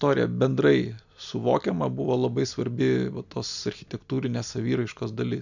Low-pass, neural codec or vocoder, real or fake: 7.2 kHz; none; real